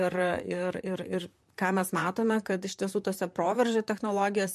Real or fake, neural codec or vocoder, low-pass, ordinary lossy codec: fake; vocoder, 44.1 kHz, 128 mel bands, Pupu-Vocoder; 14.4 kHz; MP3, 64 kbps